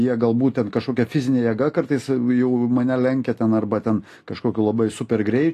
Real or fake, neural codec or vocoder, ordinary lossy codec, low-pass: real; none; AAC, 48 kbps; 14.4 kHz